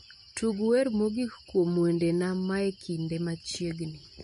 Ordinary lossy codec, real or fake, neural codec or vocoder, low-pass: MP3, 48 kbps; real; none; 10.8 kHz